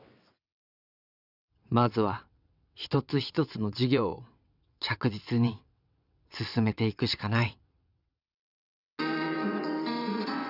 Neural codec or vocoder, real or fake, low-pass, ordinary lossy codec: vocoder, 22.05 kHz, 80 mel bands, WaveNeXt; fake; 5.4 kHz; none